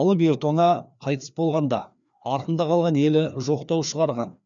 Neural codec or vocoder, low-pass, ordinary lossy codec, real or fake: codec, 16 kHz, 2 kbps, FreqCodec, larger model; 7.2 kHz; none; fake